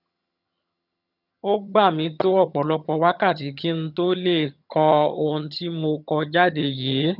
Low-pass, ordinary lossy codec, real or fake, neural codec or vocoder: 5.4 kHz; none; fake; vocoder, 22.05 kHz, 80 mel bands, HiFi-GAN